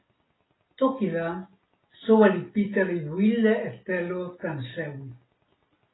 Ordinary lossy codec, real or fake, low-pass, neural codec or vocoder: AAC, 16 kbps; real; 7.2 kHz; none